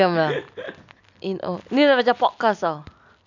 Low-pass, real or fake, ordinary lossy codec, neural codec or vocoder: 7.2 kHz; real; none; none